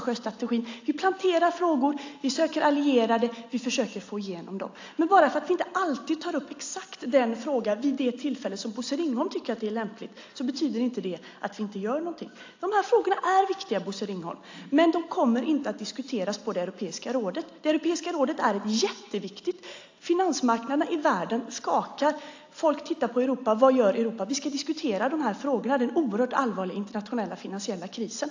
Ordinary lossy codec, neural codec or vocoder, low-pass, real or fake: AAC, 48 kbps; none; 7.2 kHz; real